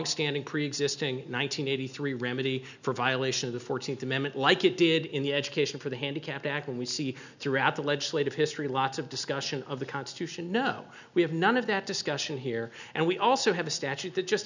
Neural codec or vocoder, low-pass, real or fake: none; 7.2 kHz; real